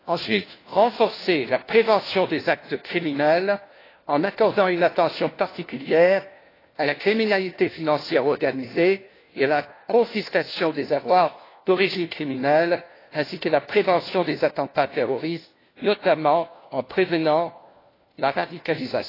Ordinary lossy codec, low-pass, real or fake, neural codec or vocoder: AAC, 24 kbps; 5.4 kHz; fake; codec, 16 kHz, 1 kbps, FunCodec, trained on LibriTTS, 50 frames a second